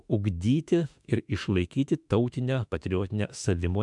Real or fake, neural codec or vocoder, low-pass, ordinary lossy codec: fake; autoencoder, 48 kHz, 32 numbers a frame, DAC-VAE, trained on Japanese speech; 10.8 kHz; MP3, 96 kbps